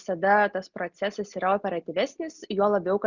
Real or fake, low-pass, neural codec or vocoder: real; 7.2 kHz; none